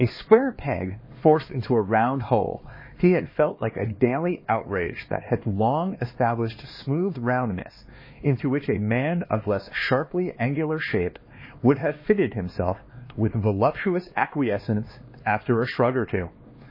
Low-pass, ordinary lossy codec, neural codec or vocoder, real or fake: 5.4 kHz; MP3, 24 kbps; codec, 16 kHz, 2 kbps, X-Codec, HuBERT features, trained on LibriSpeech; fake